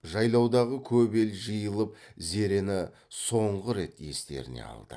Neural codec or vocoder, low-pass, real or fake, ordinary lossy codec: none; none; real; none